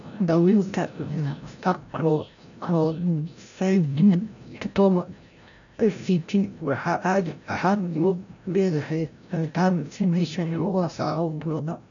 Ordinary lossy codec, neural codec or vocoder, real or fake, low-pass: none; codec, 16 kHz, 0.5 kbps, FreqCodec, larger model; fake; 7.2 kHz